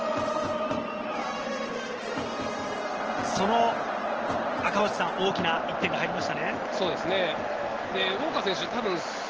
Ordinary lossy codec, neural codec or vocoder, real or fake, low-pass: Opus, 16 kbps; none; real; 7.2 kHz